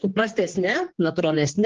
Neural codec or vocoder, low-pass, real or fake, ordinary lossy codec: codec, 16 kHz, 2 kbps, X-Codec, HuBERT features, trained on general audio; 7.2 kHz; fake; Opus, 16 kbps